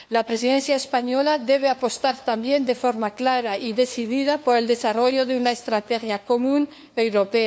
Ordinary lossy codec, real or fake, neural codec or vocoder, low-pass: none; fake; codec, 16 kHz, 2 kbps, FunCodec, trained on LibriTTS, 25 frames a second; none